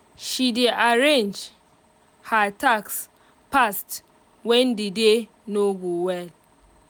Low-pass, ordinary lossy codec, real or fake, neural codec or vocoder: none; none; real; none